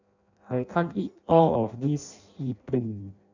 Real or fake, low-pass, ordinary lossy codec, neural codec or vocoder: fake; 7.2 kHz; none; codec, 16 kHz in and 24 kHz out, 0.6 kbps, FireRedTTS-2 codec